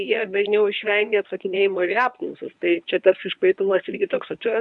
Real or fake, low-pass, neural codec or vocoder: fake; 10.8 kHz; codec, 24 kHz, 0.9 kbps, WavTokenizer, medium speech release version 1